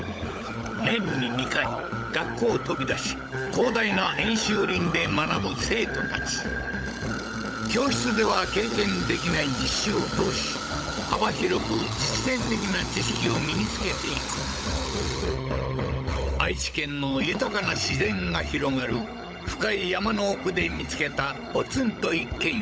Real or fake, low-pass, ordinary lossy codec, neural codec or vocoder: fake; none; none; codec, 16 kHz, 16 kbps, FunCodec, trained on LibriTTS, 50 frames a second